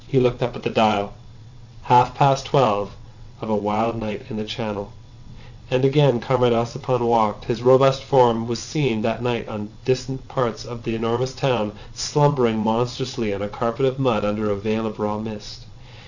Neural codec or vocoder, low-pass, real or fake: vocoder, 22.05 kHz, 80 mel bands, WaveNeXt; 7.2 kHz; fake